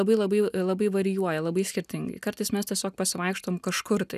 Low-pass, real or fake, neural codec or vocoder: 14.4 kHz; real; none